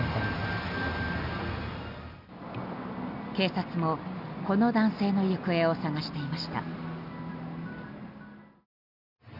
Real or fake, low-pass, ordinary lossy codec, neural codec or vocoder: real; 5.4 kHz; none; none